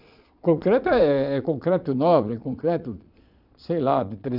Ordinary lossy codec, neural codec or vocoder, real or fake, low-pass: none; vocoder, 22.05 kHz, 80 mel bands, Vocos; fake; 5.4 kHz